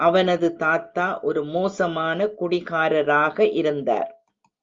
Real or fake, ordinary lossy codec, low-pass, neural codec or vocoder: real; Opus, 32 kbps; 7.2 kHz; none